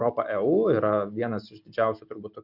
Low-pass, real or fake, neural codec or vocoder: 5.4 kHz; real; none